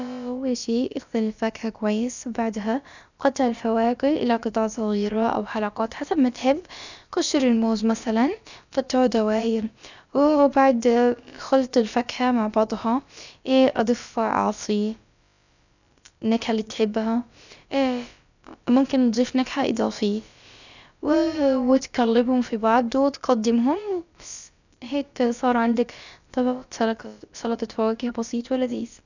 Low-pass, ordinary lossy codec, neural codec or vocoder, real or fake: 7.2 kHz; none; codec, 16 kHz, about 1 kbps, DyCAST, with the encoder's durations; fake